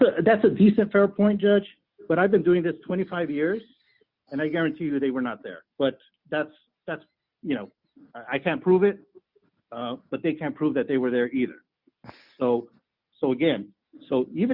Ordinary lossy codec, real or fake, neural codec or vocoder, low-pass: Opus, 64 kbps; real; none; 5.4 kHz